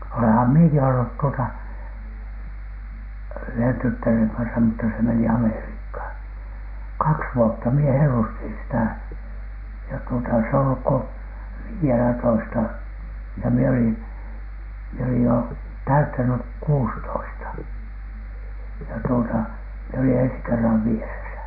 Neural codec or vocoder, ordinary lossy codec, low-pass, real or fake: none; none; 5.4 kHz; real